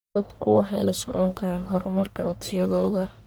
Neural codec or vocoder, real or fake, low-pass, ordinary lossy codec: codec, 44.1 kHz, 1.7 kbps, Pupu-Codec; fake; none; none